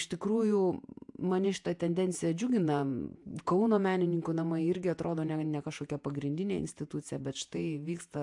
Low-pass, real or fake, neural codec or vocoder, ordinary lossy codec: 10.8 kHz; fake; vocoder, 48 kHz, 128 mel bands, Vocos; AAC, 64 kbps